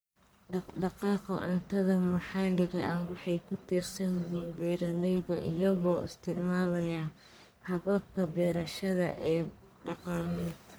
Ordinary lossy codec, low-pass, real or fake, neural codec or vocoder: none; none; fake; codec, 44.1 kHz, 1.7 kbps, Pupu-Codec